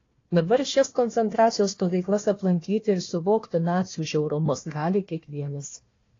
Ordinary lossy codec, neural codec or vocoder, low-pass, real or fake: AAC, 32 kbps; codec, 16 kHz, 1 kbps, FunCodec, trained on Chinese and English, 50 frames a second; 7.2 kHz; fake